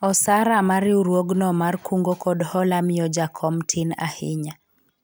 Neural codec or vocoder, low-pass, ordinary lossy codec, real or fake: none; none; none; real